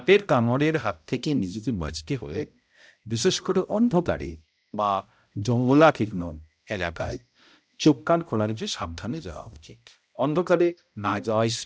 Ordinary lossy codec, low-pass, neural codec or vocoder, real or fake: none; none; codec, 16 kHz, 0.5 kbps, X-Codec, HuBERT features, trained on balanced general audio; fake